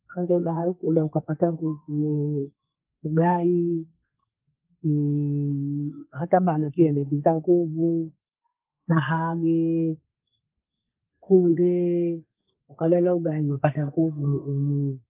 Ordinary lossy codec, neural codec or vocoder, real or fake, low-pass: Opus, 24 kbps; codec, 32 kHz, 1.9 kbps, SNAC; fake; 3.6 kHz